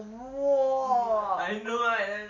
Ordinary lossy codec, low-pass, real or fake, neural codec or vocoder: none; 7.2 kHz; real; none